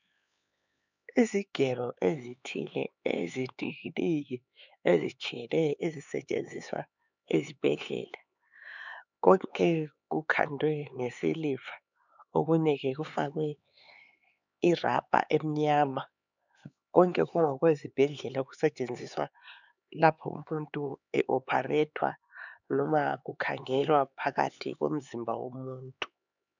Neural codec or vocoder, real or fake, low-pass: codec, 16 kHz, 4 kbps, X-Codec, HuBERT features, trained on LibriSpeech; fake; 7.2 kHz